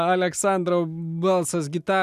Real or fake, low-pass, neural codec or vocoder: real; 14.4 kHz; none